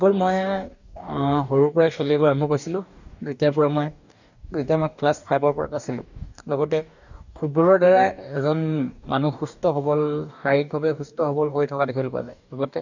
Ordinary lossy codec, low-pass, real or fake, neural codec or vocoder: none; 7.2 kHz; fake; codec, 44.1 kHz, 2.6 kbps, DAC